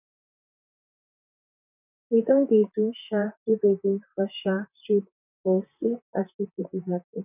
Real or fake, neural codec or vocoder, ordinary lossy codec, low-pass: fake; vocoder, 44.1 kHz, 128 mel bands, Pupu-Vocoder; none; 3.6 kHz